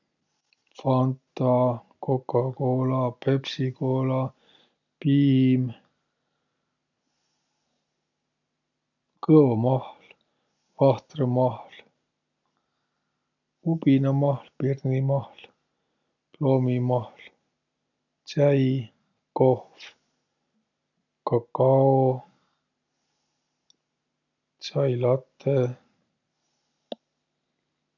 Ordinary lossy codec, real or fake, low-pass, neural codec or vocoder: none; real; 7.2 kHz; none